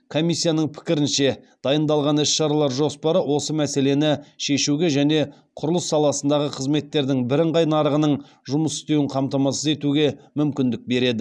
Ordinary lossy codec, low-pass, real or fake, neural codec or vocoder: none; none; real; none